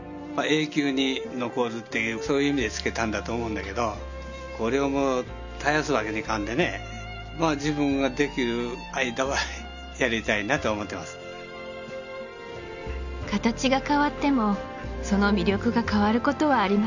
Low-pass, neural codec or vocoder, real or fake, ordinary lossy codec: 7.2 kHz; none; real; none